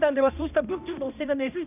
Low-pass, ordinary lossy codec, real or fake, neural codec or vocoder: 3.6 kHz; none; fake; codec, 16 kHz, 1 kbps, X-Codec, HuBERT features, trained on balanced general audio